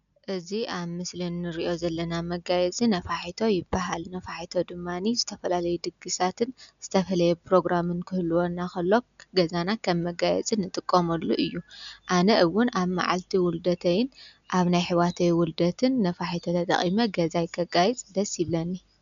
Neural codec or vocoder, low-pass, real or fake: none; 7.2 kHz; real